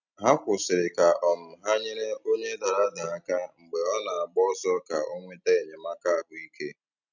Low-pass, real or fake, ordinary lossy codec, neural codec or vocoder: 7.2 kHz; real; none; none